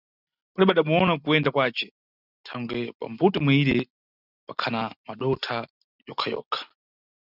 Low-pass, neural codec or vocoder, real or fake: 5.4 kHz; none; real